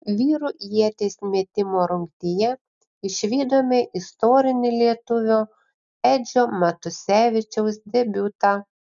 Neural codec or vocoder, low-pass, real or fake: none; 7.2 kHz; real